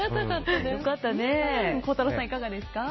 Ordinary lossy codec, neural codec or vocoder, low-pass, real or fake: MP3, 24 kbps; none; 7.2 kHz; real